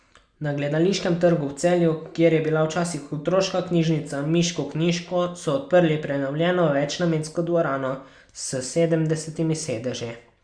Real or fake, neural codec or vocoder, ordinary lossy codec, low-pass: real; none; Opus, 64 kbps; 9.9 kHz